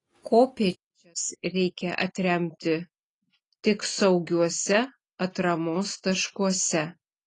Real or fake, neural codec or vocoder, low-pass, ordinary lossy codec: real; none; 10.8 kHz; AAC, 32 kbps